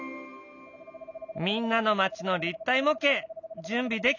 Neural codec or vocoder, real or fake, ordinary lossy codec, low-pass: none; real; none; 7.2 kHz